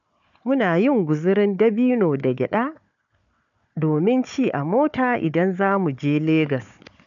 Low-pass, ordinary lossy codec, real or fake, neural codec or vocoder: 7.2 kHz; none; fake; codec, 16 kHz, 4 kbps, FunCodec, trained on Chinese and English, 50 frames a second